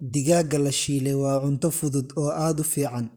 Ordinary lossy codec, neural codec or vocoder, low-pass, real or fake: none; vocoder, 44.1 kHz, 128 mel bands, Pupu-Vocoder; none; fake